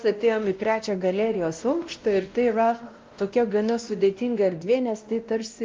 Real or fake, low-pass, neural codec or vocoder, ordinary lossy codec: fake; 7.2 kHz; codec, 16 kHz, 1 kbps, X-Codec, WavLM features, trained on Multilingual LibriSpeech; Opus, 24 kbps